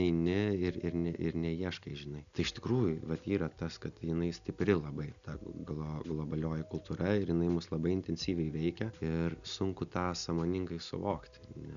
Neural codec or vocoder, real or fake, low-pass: none; real; 7.2 kHz